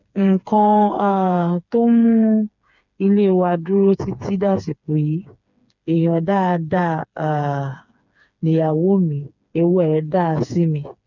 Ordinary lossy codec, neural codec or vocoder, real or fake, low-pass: none; codec, 16 kHz, 4 kbps, FreqCodec, smaller model; fake; 7.2 kHz